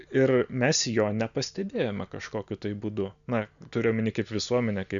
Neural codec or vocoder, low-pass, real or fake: none; 7.2 kHz; real